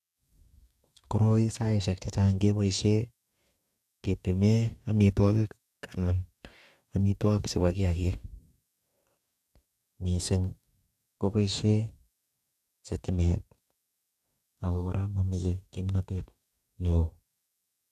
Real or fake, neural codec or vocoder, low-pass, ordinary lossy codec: fake; codec, 44.1 kHz, 2.6 kbps, DAC; 14.4 kHz; none